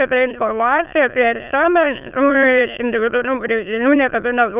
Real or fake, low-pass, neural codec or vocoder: fake; 3.6 kHz; autoencoder, 22.05 kHz, a latent of 192 numbers a frame, VITS, trained on many speakers